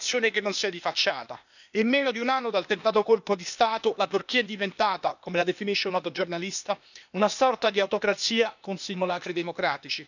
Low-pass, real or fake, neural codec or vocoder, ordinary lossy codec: 7.2 kHz; fake; codec, 16 kHz, 0.8 kbps, ZipCodec; none